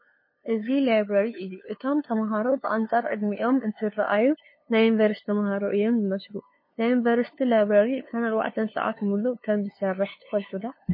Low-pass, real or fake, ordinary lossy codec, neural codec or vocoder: 5.4 kHz; fake; MP3, 24 kbps; codec, 16 kHz, 2 kbps, FunCodec, trained on LibriTTS, 25 frames a second